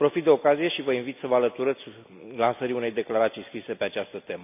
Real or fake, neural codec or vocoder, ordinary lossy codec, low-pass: real; none; none; 3.6 kHz